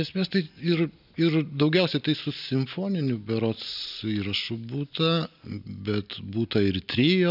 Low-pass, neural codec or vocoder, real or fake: 5.4 kHz; none; real